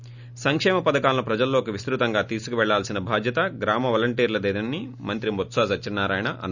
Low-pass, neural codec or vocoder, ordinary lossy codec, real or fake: 7.2 kHz; none; none; real